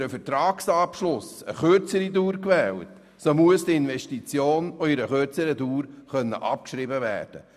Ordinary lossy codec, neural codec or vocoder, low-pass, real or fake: none; none; 14.4 kHz; real